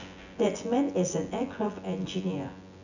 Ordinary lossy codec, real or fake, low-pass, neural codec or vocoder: none; fake; 7.2 kHz; vocoder, 24 kHz, 100 mel bands, Vocos